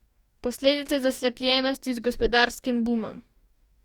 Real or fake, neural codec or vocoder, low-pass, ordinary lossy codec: fake; codec, 44.1 kHz, 2.6 kbps, DAC; 19.8 kHz; Opus, 64 kbps